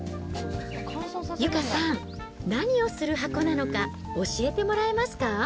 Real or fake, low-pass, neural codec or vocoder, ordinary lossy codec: real; none; none; none